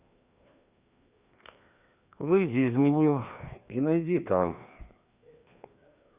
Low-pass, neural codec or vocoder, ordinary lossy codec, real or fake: 3.6 kHz; codec, 16 kHz, 2 kbps, FreqCodec, larger model; Opus, 64 kbps; fake